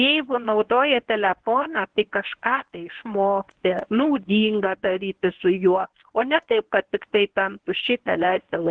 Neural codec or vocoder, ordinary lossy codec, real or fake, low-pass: codec, 24 kHz, 0.9 kbps, WavTokenizer, medium speech release version 1; Opus, 16 kbps; fake; 9.9 kHz